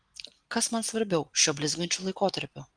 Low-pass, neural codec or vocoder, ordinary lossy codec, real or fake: 9.9 kHz; none; Opus, 24 kbps; real